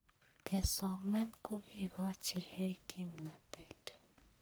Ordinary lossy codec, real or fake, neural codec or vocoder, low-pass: none; fake; codec, 44.1 kHz, 1.7 kbps, Pupu-Codec; none